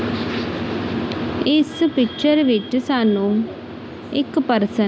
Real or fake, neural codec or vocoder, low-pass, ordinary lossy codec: real; none; none; none